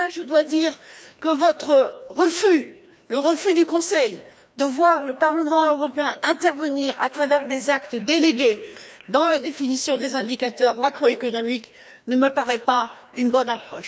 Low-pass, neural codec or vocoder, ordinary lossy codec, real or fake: none; codec, 16 kHz, 1 kbps, FreqCodec, larger model; none; fake